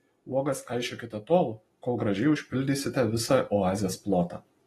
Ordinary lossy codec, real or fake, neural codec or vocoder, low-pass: AAC, 32 kbps; real; none; 19.8 kHz